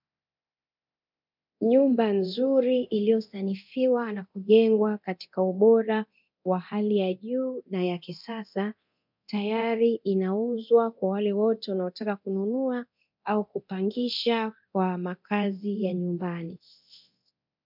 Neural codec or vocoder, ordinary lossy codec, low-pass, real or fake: codec, 24 kHz, 0.9 kbps, DualCodec; MP3, 48 kbps; 5.4 kHz; fake